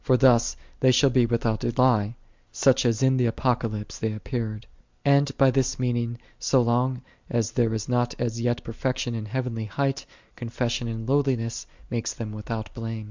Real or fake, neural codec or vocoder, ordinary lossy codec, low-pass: real; none; MP3, 64 kbps; 7.2 kHz